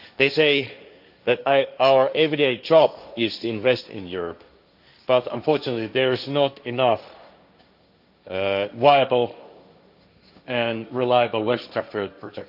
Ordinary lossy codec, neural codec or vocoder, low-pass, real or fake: none; codec, 16 kHz, 1.1 kbps, Voila-Tokenizer; 5.4 kHz; fake